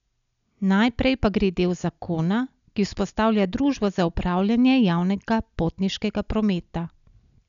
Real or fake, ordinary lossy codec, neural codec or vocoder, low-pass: real; none; none; 7.2 kHz